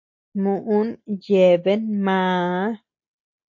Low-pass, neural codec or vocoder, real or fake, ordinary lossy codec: 7.2 kHz; none; real; AAC, 48 kbps